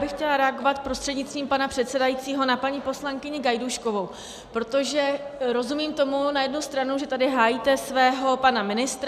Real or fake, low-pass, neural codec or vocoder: real; 14.4 kHz; none